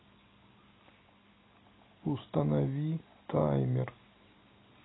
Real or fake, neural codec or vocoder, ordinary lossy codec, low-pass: real; none; AAC, 16 kbps; 7.2 kHz